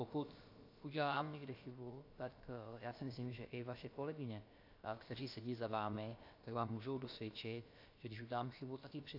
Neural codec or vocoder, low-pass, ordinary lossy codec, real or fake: codec, 16 kHz, about 1 kbps, DyCAST, with the encoder's durations; 5.4 kHz; AAC, 32 kbps; fake